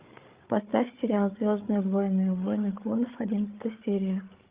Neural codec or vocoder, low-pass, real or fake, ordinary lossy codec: codec, 16 kHz, 8 kbps, FreqCodec, larger model; 3.6 kHz; fake; Opus, 32 kbps